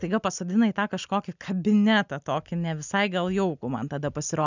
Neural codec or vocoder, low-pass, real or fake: none; 7.2 kHz; real